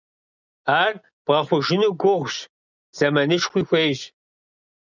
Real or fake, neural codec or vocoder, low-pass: real; none; 7.2 kHz